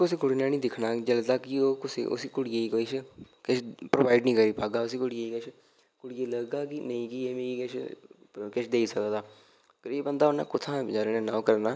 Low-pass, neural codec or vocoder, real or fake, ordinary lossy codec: none; none; real; none